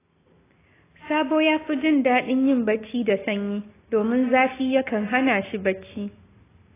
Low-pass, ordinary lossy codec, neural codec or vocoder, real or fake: 3.6 kHz; AAC, 16 kbps; none; real